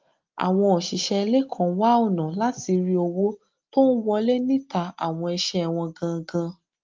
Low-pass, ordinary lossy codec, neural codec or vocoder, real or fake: 7.2 kHz; Opus, 24 kbps; none; real